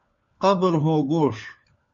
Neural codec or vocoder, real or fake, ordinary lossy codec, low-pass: codec, 16 kHz, 4 kbps, FunCodec, trained on LibriTTS, 50 frames a second; fake; MP3, 48 kbps; 7.2 kHz